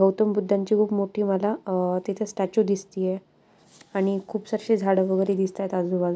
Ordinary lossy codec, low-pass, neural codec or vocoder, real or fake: none; none; none; real